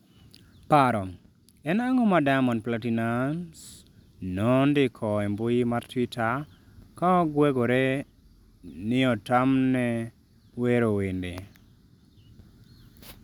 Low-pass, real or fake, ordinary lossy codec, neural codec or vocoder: 19.8 kHz; real; none; none